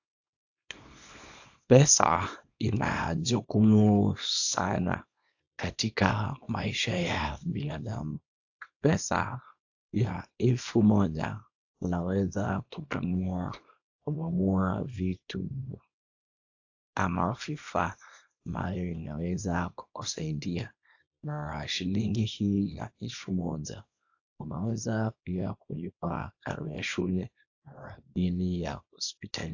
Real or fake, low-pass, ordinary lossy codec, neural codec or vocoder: fake; 7.2 kHz; AAC, 48 kbps; codec, 24 kHz, 0.9 kbps, WavTokenizer, small release